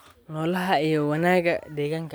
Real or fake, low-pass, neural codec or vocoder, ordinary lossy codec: real; none; none; none